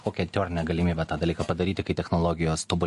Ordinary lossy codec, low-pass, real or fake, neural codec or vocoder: MP3, 48 kbps; 14.4 kHz; real; none